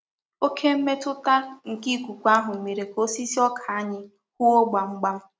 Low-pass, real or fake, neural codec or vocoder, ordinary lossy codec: none; real; none; none